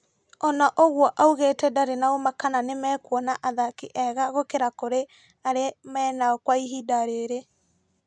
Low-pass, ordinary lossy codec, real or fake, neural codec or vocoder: 9.9 kHz; none; real; none